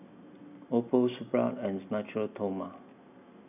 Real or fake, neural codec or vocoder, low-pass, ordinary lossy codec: real; none; 3.6 kHz; none